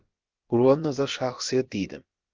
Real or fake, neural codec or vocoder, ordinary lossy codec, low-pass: fake; codec, 16 kHz, about 1 kbps, DyCAST, with the encoder's durations; Opus, 32 kbps; 7.2 kHz